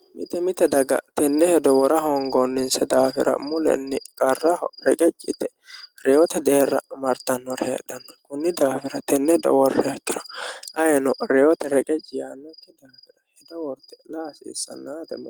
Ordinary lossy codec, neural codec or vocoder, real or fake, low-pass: Opus, 24 kbps; none; real; 19.8 kHz